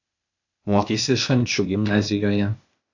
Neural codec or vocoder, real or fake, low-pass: codec, 16 kHz, 0.8 kbps, ZipCodec; fake; 7.2 kHz